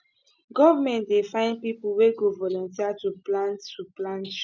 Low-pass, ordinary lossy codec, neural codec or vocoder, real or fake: none; none; none; real